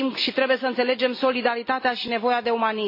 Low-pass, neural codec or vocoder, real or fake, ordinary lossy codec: 5.4 kHz; none; real; MP3, 24 kbps